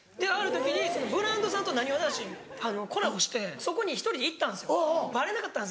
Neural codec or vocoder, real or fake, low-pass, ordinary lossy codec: none; real; none; none